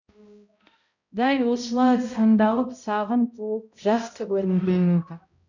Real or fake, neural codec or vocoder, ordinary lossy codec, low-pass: fake; codec, 16 kHz, 0.5 kbps, X-Codec, HuBERT features, trained on balanced general audio; none; 7.2 kHz